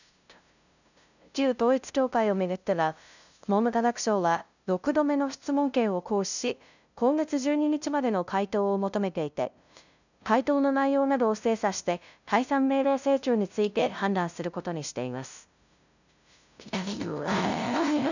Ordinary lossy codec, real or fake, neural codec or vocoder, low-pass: none; fake; codec, 16 kHz, 0.5 kbps, FunCodec, trained on LibriTTS, 25 frames a second; 7.2 kHz